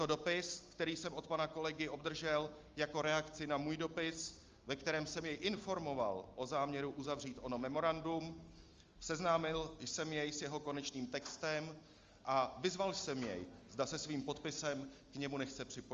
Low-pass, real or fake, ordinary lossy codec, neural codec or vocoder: 7.2 kHz; real; Opus, 32 kbps; none